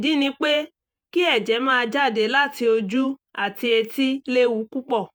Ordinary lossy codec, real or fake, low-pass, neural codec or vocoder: none; fake; none; vocoder, 48 kHz, 128 mel bands, Vocos